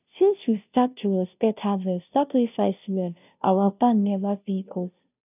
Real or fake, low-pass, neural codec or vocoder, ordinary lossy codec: fake; 3.6 kHz; codec, 16 kHz, 0.5 kbps, FunCodec, trained on Chinese and English, 25 frames a second; none